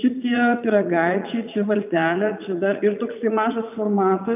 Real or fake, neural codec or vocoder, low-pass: fake; vocoder, 44.1 kHz, 80 mel bands, Vocos; 3.6 kHz